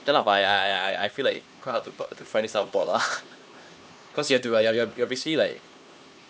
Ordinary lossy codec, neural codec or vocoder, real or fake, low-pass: none; codec, 16 kHz, 2 kbps, X-Codec, HuBERT features, trained on LibriSpeech; fake; none